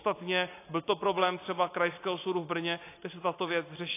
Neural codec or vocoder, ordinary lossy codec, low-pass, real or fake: none; AAC, 24 kbps; 3.6 kHz; real